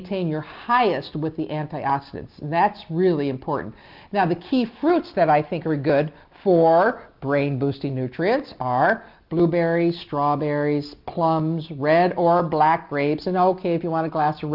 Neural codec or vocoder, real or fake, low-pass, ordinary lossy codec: none; real; 5.4 kHz; Opus, 24 kbps